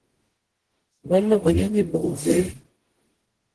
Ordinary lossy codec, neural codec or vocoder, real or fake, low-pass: Opus, 16 kbps; codec, 44.1 kHz, 0.9 kbps, DAC; fake; 10.8 kHz